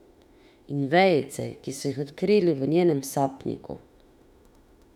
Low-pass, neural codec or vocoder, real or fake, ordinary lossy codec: 19.8 kHz; autoencoder, 48 kHz, 32 numbers a frame, DAC-VAE, trained on Japanese speech; fake; none